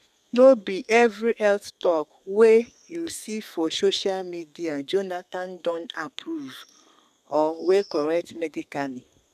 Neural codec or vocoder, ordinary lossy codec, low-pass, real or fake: codec, 32 kHz, 1.9 kbps, SNAC; none; 14.4 kHz; fake